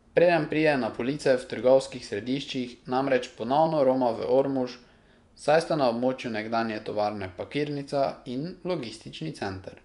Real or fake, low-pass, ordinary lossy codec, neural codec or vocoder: fake; 10.8 kHz; none; vocoder, 24 kHz, 100 mel bands, Vocos